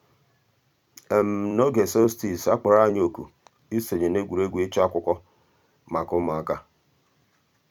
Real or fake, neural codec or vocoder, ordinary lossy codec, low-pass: fake; vocoder, 44.1 kHz, 128 mel bands every 256 samples, BigVGAN v2; none; 19.8 kHz